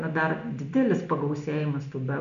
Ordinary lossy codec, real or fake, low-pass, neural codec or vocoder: AAC, 96 kbps; real; 7.2 kHz; none